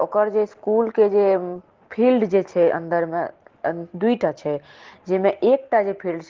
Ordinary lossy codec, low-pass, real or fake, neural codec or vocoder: Opus, 16 kbps; 7.2 kHz; real; none